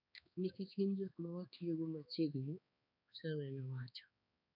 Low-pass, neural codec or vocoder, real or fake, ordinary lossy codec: 5.4 kHz; codec, 16 kHz, 2 kbps, X-Codec, HuBERT features, trained on balanced general audio; fake; none